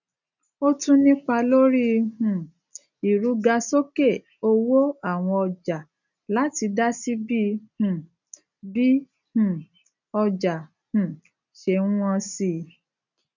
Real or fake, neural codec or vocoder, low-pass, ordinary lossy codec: real; none; 7.2 kHz; none